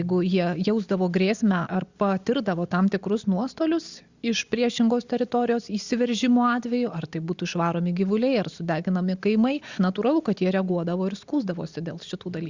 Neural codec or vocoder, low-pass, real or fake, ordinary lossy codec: none; 7.2 kHz; real; Opus, 64 kbps